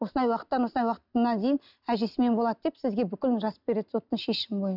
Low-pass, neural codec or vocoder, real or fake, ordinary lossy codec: 5.4 kHz; none; real; none